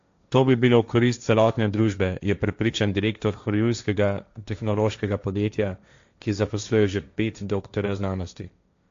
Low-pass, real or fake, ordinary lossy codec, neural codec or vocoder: 7.2 kHz; fake; AAC, 64 kbps; codec, 16 kHz, 1.1 kbps, Voila-Tokenizer